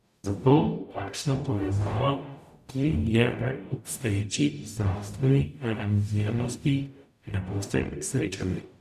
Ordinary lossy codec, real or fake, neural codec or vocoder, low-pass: none; fake; codec, 44.1 kHz, 0.9 kbps, DAC; 14.4 kHz